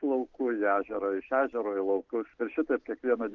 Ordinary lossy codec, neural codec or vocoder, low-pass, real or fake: Opus, 32 kbps; none; 7.2 kHz; real